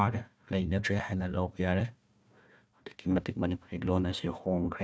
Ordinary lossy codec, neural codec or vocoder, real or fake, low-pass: none; codec, 16 kHz, 1 kbps, FunCodec, trained on Chinese and English, 50 frames a second; fake; none